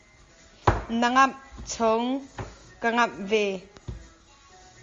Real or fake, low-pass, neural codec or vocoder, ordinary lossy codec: real; 7.2 kHz; none; Opus, 32 kbps